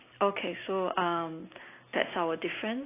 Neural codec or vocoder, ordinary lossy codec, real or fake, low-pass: none; AAC, 24 kbps; real; 3.6 kHz